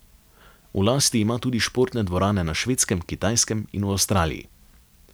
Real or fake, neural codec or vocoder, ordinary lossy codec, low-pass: real; none; none; none